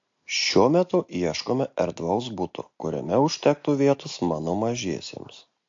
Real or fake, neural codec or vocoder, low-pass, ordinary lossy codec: real; none; 7.2 kHz; AAC, 48 kbps